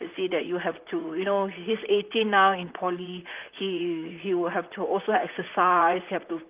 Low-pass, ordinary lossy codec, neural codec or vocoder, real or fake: 3.6 kHz; Opus, 32 kbps; vocoder, 44.1 kHz, 128 mel bands, Pupu-Vocoder; fake